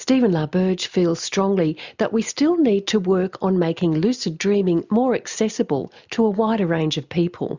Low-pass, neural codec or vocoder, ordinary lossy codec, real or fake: 7.2 kHz; none; Opus, 64 kbps; real